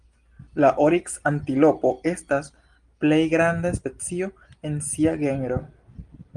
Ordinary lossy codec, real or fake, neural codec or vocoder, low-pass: Opus, 24 kbps; real; none; 9.9 kHz